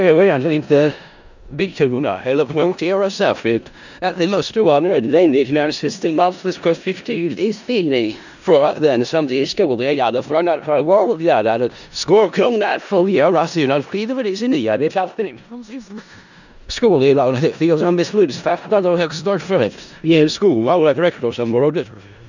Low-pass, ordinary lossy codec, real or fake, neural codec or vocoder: 7.2 kHz; none; fake; codec, 16 kHz in and 24 kHz out, 0.4 kbps, LongCat-Audio-Codec, four codebook decoder